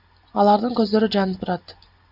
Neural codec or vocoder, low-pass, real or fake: none; 5.4 kHz; real